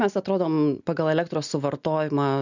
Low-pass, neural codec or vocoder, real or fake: 7.2 kHz; none; real